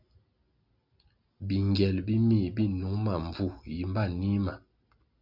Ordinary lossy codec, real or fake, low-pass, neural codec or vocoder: Opus, 64 kbps; real; 5.4 kHz; none